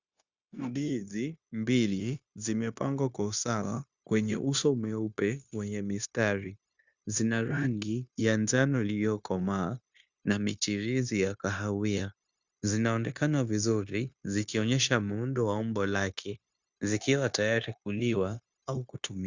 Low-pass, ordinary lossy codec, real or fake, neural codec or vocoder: 7.2 kHz; Opus, 64 kbps; fake; codec, 16 kHz, 0.9 kbps, LongCat-Audio-Codec